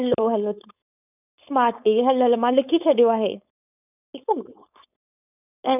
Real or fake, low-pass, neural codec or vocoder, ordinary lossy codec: fake; 3.6 kHz; codec, 16 kHz, 4.8 kbps, FACodec; none